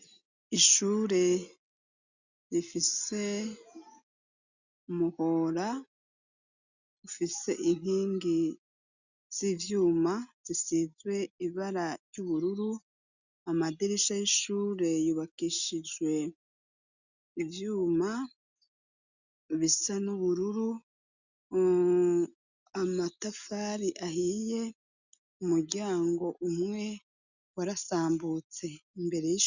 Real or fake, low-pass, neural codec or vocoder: real; 7.2 kHz; none